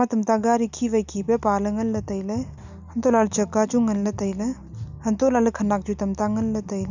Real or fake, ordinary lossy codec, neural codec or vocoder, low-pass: real; none; none; 7.2 kHz